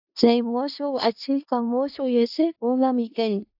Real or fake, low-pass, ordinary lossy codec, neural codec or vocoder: fake; 5.4 kHz; AAC, 48 kbps; codec, 16 kHz in and 24 kHz out, 0.4 kbps, LongCat-Audio-Codec, four codebook decoder